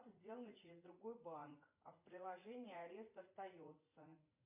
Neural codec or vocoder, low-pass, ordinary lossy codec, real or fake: vocoder, 44.1 kHz, 80 mel bands, Vocos; 3.6 kHz; MP3, 24 kbps; fake